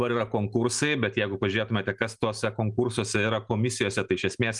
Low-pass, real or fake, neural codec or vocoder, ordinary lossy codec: 10.8 kHz; real; none; Opus, 32 kbps